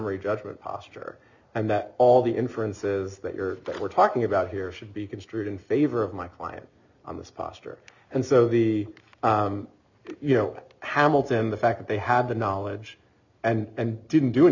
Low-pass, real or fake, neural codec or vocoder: 7.2 kHz; real; none